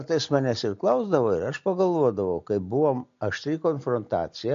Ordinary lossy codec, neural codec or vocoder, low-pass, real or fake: MP3, 48 kbps; none; 7.2 kHz; real